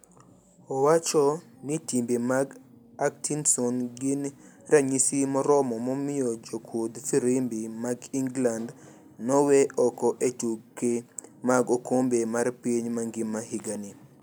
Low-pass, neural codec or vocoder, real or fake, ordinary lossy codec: none; none; real; none